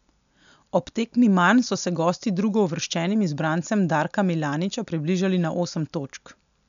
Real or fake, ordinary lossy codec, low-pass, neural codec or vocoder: real; none; 7.2 kHz; none